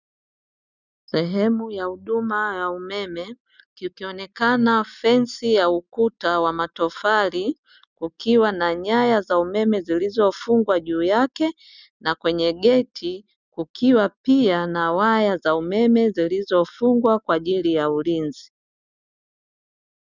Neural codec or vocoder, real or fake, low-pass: none; real; 7.2 kHz